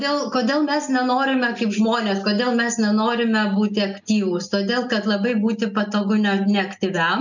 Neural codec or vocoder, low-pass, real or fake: none; 7.2 kHz; real